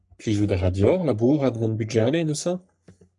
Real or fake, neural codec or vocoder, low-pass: fake; codec, 44.1 kHz, 3.4 kbps, Pupu-Codec; 10.8 kHz